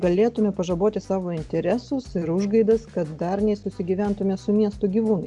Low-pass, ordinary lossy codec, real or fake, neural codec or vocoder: 10.8 kHz; Opus, 64 kbps; real; none